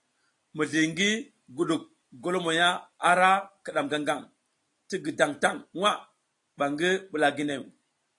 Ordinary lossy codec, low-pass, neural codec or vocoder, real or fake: AAC, 48 kbps; 10.8 kHz; none; real